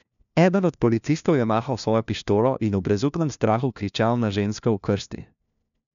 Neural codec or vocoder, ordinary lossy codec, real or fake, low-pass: codec, 16 kHz, 1 kbps, FunCodec, trained on LibriTTS, 50 frames a second; none; fake; 7.2 kHz